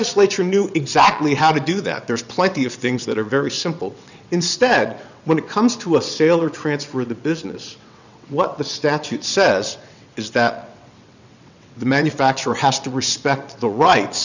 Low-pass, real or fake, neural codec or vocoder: 7.2 kHz; fake; vocoder, 22.05 kHz, 80 mel bands, WaveNeXt